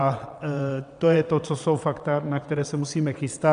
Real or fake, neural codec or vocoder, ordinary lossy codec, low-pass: fake; vocoder, 22.05 kHz, 80 mel bands, WaveNeXt; MP3, 96 kbps; 9.9 kHz